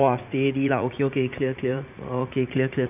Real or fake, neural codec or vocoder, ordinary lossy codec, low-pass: fake; vocoder, 44.1 kHz, 80 mel bands, Vocos; none; 3.6 kHz